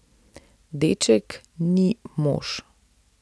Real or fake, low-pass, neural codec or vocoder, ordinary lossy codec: real; none; none; none